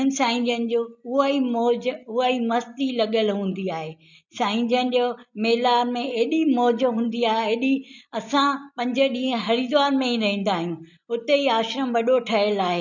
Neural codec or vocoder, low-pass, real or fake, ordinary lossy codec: none; 7.2 kHz; real; none